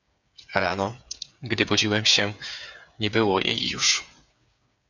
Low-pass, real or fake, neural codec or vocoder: 7.2 kHz; fake; codec, 16 kHz, 8 kbps, FreqCodec, smaller model